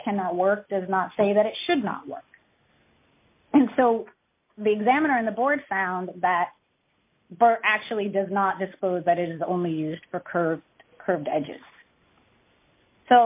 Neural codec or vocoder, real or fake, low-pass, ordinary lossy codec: none; real; 3.6 kHz; AAC, 32 kbps